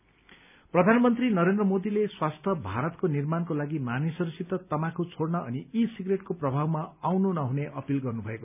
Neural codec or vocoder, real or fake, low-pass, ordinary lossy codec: none; real; 3.6 kHz; none